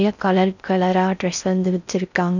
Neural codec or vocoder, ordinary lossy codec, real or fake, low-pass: codec, 16 kHz in and 24 kHz out, 0.6 kbps, FocalCodec, streaming, 4096 codes; none; fake; 7.2 kHz